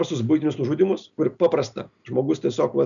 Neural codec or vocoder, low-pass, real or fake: none; 7.2 kHz; real